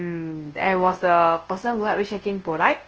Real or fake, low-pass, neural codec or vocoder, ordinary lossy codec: fake; 7.2 kHz; codec, 24 kHz, 0.9 kbps, WavTokenizer, large speech release; Opus, 16 kbps